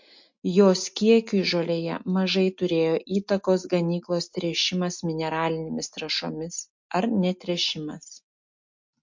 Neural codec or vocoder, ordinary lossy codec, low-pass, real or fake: none; MP3, 48 kbps; 7.2 kHz; real